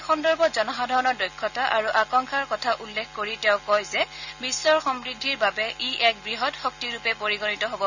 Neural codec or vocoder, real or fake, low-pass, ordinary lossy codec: none; real; 7.2 kHz; none